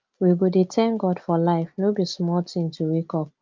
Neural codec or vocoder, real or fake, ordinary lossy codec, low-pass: none; real; Opus, 32 kbps; 7.2 kHz